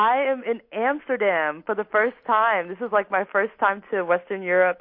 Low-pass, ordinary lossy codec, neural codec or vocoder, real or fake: 5.4 kHz; MP3, 32 kbps; none; real